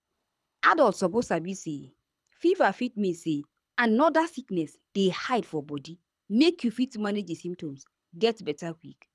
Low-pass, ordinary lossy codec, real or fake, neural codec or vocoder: none; none; fake; codec, 24 kHz, 6 kbps, HILCodec